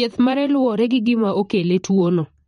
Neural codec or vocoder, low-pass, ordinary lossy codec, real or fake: vocoder, 44.1 kHz, 128 mel bands, Pupu-Vocoder; 19.8 kHz; MP3, 48 kbps; fake